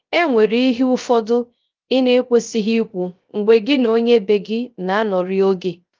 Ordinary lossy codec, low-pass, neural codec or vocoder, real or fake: Opus, 24 kbps; 7.2 kHz; codec, 16 kHz, 0.3 kbps, FocalCodec; fake